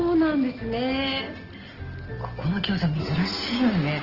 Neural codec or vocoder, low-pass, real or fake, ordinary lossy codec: none; 5.4 kHz; real; Opus, 16 kbps